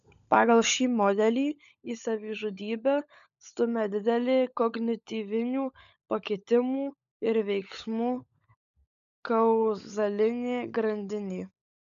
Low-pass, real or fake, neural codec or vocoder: 7.2 kHz; fake; codec, 16 kHz, 16 kbps, FunCodec, trained on LibriTTS, 50 frames a second